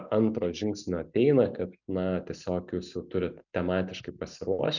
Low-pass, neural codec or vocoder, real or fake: 7.2 kHz; none; real